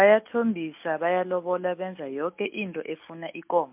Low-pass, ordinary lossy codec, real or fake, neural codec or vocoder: 3.6 kHz; MP3, 32 kbps; real; none